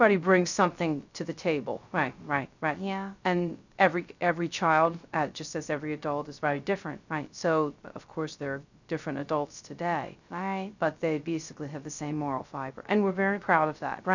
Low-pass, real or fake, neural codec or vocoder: 7.2 kHz; fake; codec, 16 kHz, 0.3 kbps, FocalCodec